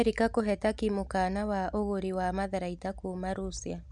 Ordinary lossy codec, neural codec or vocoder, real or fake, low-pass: none; none; real; 10.8 kHz